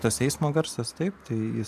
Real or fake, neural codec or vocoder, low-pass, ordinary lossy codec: fake; vocoder, 44.1 kHz, 128 mel bands every 512 samples, BigVGAN v2; 14.4 kHz; AAC, 96 kbps